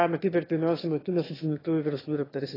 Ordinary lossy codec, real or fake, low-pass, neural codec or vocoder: AAC, 24 kbps; fake; 5.4 kHz; autoencoder, 22.05 kHz, a latent of 192 numbers a frame, VITS, trained on one speaker